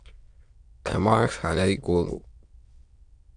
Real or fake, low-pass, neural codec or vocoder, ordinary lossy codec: fake; 9.9 kHz; autoencoder, 22.05 kHz, a latent of 192 numbers a frame, VITS, trained on many speakers; Opus, 64 kbps